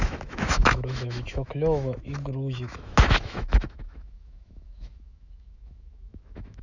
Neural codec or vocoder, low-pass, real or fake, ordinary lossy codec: autoencoder, 48 kHz, 128 numbers a frame, DAC-VAE, trained on Japanese speech; 7.2 kHz; fake; none